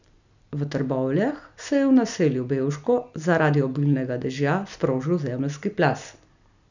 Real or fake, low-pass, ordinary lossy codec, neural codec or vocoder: real; 7.2 kHz; none; none